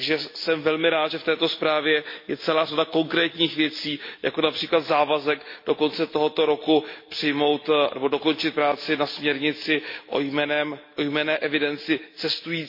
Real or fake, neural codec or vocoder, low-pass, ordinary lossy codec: real; none; 5.4 kHz; MP3, 32 kbps